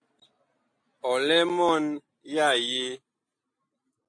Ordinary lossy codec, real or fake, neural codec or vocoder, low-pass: AAC, 48 kbps; real; none; 9.9 kHz